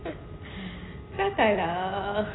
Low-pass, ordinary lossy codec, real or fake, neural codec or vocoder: 7.2 kHz; AAC, 16 kbps; real; none